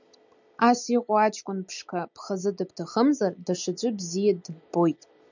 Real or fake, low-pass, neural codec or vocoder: real; 7.2 kHz; none